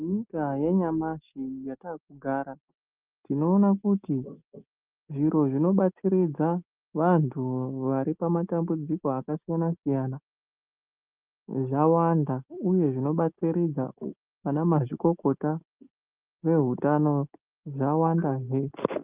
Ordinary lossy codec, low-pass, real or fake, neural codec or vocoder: Opus, 24 kbps; 3.6 kHz; real; none